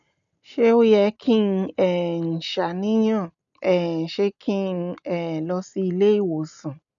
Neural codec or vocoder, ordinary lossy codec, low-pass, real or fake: none; none; 7.2 kHz; real